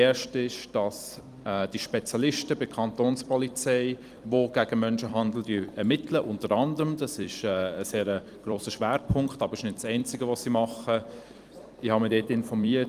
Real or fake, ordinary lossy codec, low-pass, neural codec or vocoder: fake; Opus, 32 kbps; 14.4 kHz; vocoder, 44.1 kHz, 128 mel bands every 256 samples, BigVGAN v2